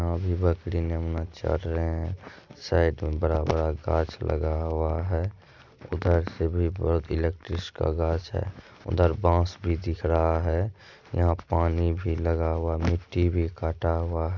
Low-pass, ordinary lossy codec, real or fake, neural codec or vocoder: 7.2 kHz; none; real; none